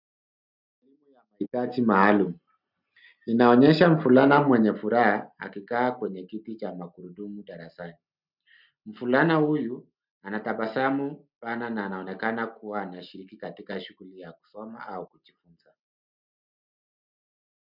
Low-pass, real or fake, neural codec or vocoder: 5.4 kHz; real; none